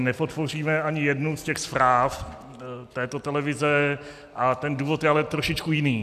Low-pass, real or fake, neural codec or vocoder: 14.4 kHz; real; none